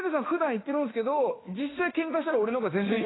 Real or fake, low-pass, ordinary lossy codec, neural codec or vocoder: fake; 7.2 kHz; AAC, 16 kbps; autoencoder, 48 kHz, 32 numbers a frame, DAC-VAE, trained on Japanese speech